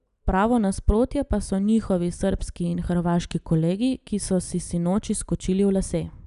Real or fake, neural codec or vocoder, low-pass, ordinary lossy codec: real; none; 10.8 kHz; none